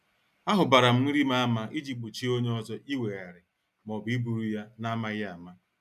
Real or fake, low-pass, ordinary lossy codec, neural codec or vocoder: real; 14.4 kHz; none; none